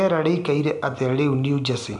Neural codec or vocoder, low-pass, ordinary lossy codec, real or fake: none; 10.8 kHz; none; real